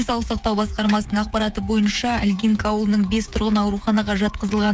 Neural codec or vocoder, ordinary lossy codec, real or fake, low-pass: codec, 16 kHz, 8 kbps, FreqCodec, smaller model; none; fake; none